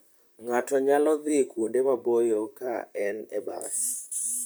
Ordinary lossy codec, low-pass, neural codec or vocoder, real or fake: none; none; vocoder, 44.1 kHz, 128 mel bands, Pupu-Vocoder; fake